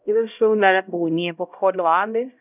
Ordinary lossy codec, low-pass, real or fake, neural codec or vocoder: none; 3.6 kHz; fake; codec, 16 kHz, 0.5 kbps, X-Codec, HuBERT features, trained on LibriSpeech